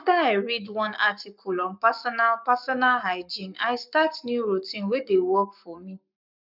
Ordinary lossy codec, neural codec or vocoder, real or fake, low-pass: none; codec, 44.1 kHz, 7.8 kbps, Pupu-Codec; fake; 5.4 kHz